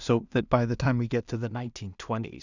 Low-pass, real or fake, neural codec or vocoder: 7.2 kHz; fake; codec, 16 kHz in and 24 kHz out, 0.4 kbps, LongCat-Audio-Codec, two codebook decoder